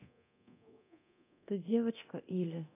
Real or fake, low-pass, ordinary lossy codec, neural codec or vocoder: fake; 3.6 kHz; none; codec, 24 kHz, 0.9 kbps, DualCodec